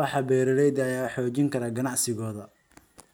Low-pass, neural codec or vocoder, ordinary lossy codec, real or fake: none; none; none; real